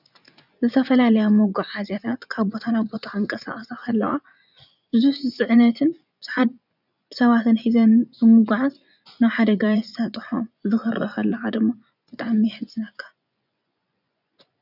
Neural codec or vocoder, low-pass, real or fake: vocoder, 24 kHz, 100 mel bands, Vocos; 5.4 kHz; fake